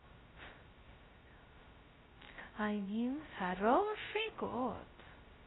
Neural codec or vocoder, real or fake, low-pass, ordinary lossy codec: codec, 16 kHz, 0.2 kbps, FocalCodec; fake; 7.2 kHz; AAC, 16 kbps